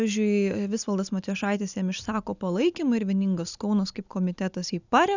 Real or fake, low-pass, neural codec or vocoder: real; 7.2 kHz; none